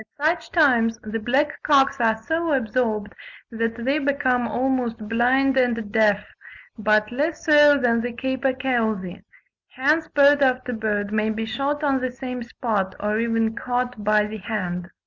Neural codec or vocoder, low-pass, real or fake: none; 7.2 kHz; real